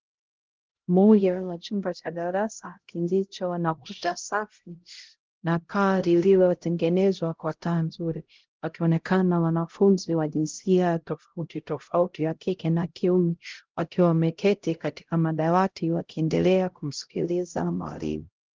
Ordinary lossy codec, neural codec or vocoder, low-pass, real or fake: Opus, 16 kbps; codec, 16 kHz, 0.5 kbps, X-Codec, HuBERT features, trained on LibriSpeech; 7.2 kHz; fake